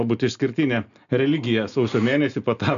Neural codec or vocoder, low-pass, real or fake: none; 7.2 kHz; real